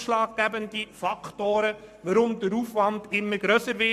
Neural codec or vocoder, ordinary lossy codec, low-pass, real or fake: codec, 44.1 kHz, 7.8 kbps, Pupu-Codec; MP3, 96 kbps; 14.4 kHz; fake